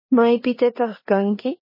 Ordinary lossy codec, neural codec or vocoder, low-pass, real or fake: MP3, 24 kbps; codec, 16 kHz in and 24 kHz out, 0.9 kbps, LongCat-Audio-Codec, four codebook decoder; 5.4 kHz; fake